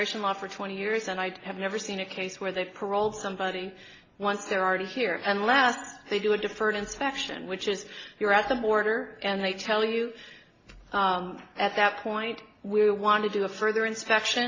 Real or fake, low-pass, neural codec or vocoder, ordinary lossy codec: real; 7.2 kHz; none; AAC, 32 kbps